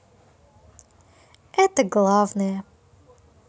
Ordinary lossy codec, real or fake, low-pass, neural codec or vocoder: none; real; none; none